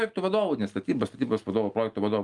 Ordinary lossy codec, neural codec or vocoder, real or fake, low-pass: Opus, 32 kbps; none; real; 10.8 kHz